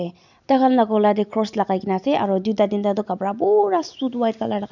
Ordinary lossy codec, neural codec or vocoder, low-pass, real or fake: none; none; 7.2 kHz; real